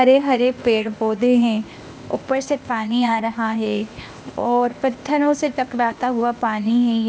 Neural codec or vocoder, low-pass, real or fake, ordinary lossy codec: codec, 16 kHz, 0.8 kbps, ZipCodec; none; fake; none